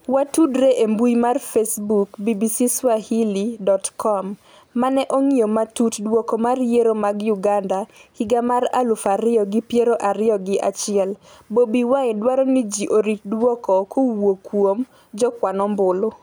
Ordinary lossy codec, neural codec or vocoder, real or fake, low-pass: none; none; real; none